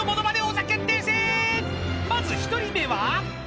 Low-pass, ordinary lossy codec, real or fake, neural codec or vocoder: none; none; real; none